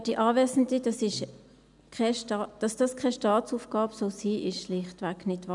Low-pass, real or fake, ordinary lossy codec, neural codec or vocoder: 10.8 kHz; real; none; none